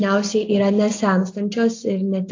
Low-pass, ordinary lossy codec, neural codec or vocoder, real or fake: 7.2 kHz; AAC, 48 kbps; none; real